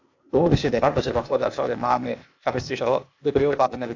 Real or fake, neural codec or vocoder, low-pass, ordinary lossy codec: fake; codec, 16 kHz, 0.8 kbps, ZipCodec; 7.2 kHz; AAC, 48 kbps